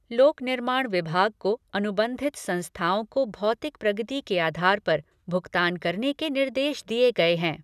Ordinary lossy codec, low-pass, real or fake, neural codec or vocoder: none; 14.4 kHz; real; none